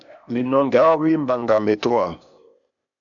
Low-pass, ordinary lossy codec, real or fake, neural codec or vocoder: 7.2 kHz; MP3, 96 kbps; fake; codec, 16 kHz, 0.8 kbps, ZipCodec